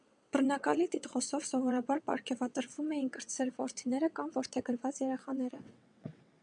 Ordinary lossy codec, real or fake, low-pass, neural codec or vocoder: MP3, 96 kbps; fake; 9.9 kHz; vocoder, 22.05 kHz, 80 mel bands, WaveNeXt